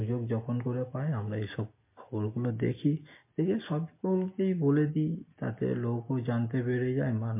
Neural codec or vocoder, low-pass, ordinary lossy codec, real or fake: none; 3.6 kHz; MP3, 24 kbps; real